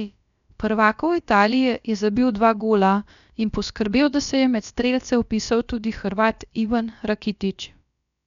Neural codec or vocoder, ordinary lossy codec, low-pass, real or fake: codec, 16 kHz, about 1 kbps, DyCAST, with the encoder's durations; none; 7.2 kHz; fake